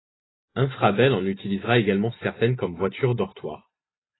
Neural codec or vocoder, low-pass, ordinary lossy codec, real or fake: none; 7.2 kHz; AAC, 16 kbps; real